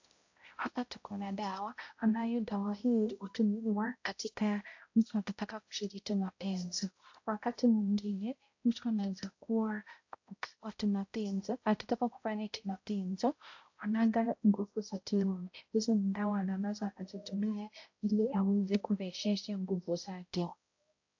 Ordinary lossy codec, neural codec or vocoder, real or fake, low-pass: AAC, 48 kbps; codec, 16 kHz, 0.5 kbps, X-Codec, HuBERT features, trained on balanced general audio; fake; 7.2 kHz